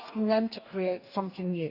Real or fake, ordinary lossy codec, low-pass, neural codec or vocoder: fake; none; 5.4 kHz; codec, 44.1 kHz, 2.6 kbps, DAC